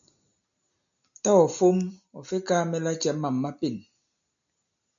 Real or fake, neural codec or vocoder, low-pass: real; none; 7.2 kHz